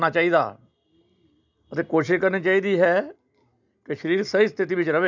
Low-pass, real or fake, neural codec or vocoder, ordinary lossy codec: 7.2 kHz; real; none; none